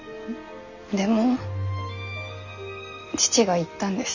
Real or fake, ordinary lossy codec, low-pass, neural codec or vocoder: real; none; 7.2 kHz; none